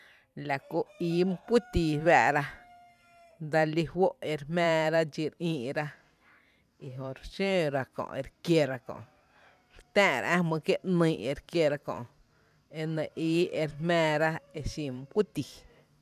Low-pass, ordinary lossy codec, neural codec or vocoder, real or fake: 14.4 kHz; none; vocoder, 44.1 kHz, 128 mel bands every 512 samples, BigVGAN v2; fake